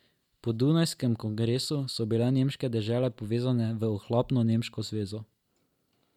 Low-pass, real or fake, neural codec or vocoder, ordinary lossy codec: 19.8 kHz; real; none; MP3, 96 kbps